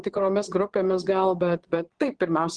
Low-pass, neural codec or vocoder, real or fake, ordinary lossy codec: 9.9 kHz; vocoder, 22.05 kHz, 80 mel bands, Vocos; fake; Opus, 16 kbps